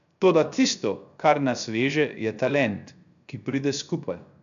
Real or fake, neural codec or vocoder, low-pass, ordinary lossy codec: fake; codec, 16 kHz, 0.3 kbps, FocalCodec; 7.2 kHz; none